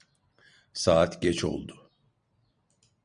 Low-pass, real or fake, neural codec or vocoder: 9.9 kHz; real; none